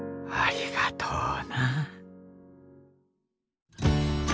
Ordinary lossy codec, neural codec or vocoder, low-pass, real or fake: none; none; none; real